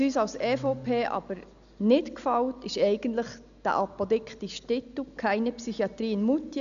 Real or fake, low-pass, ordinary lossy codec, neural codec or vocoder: real; 7.2 kHz; none; none